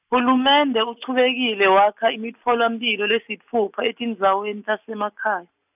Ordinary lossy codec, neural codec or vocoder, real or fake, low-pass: none; none; real; 3.6 kHz